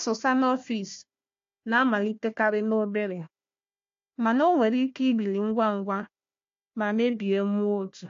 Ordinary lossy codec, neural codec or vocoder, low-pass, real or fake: AAC, 48 kbps; codec, 16 kHz, 1 kbps, FunCodec, trained on Chinese and English, 50 frames a second; 7.2 kHz; fake